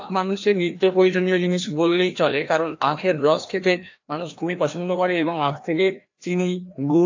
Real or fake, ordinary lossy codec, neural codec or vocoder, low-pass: fake; AAC, 48 kbps; codec, 16 kHz, 1 kbps, FreqCodec, larger model; 7.2 kHz